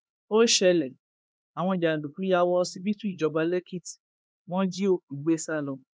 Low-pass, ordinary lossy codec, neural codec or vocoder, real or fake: none; none; codec, 16 kHz, 2 kbps, X-Codec, HuBERT features, trained on LibriSpeech; fake